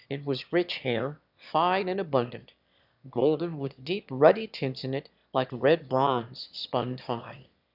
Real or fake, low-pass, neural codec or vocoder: fake; 5.4 kHz; autoencoder, 22.05 kHz, a latent of 192 numbers a frame, VITS, trained on one speaker